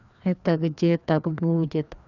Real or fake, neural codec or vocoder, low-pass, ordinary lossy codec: fake; codec, 16 kHz, 2 kbps, FreqCodec, larger model; 7.2 kHz; none